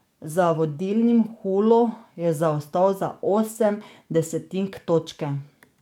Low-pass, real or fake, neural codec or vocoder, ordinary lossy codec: 19.8 kHz; fake; codec, 44.1 kHz, 7.8 kbps, DAC; MP3, 96 kbps